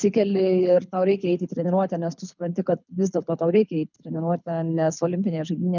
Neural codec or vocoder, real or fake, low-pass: codec, 24 kHz, 6 kbps, HILCodec; fake; 7.2 kHz